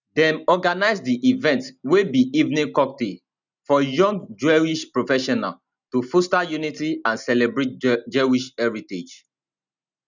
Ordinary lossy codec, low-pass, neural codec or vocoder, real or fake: none; 7.2 kHz; none; real